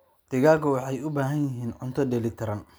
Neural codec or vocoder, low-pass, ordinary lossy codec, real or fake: none; none; none; real